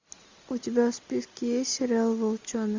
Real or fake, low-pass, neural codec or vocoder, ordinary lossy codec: real; 7.2 kHz; none; MP3, 64 kbps